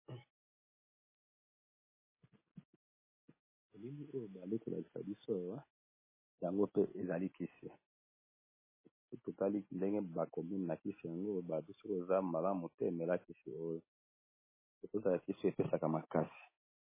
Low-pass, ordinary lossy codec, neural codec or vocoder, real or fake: 3.6 kHz; MP3, 16 kbps; none; real